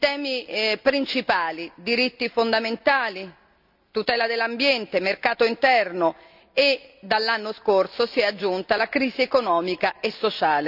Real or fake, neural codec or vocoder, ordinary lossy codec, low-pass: real; none; none; 5.4 kHz